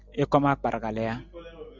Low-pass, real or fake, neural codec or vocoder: 7.2 kHz; real; none